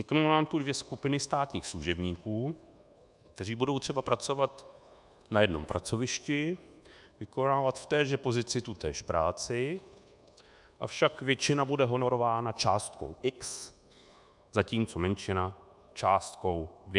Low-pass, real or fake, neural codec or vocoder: 10.8 kHz; fake; codec, 24 kHz, 1.2 kbps, DualCodec